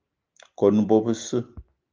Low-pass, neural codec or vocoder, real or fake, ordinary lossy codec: 7.2 kHz; none; real; Opus, 32 kbps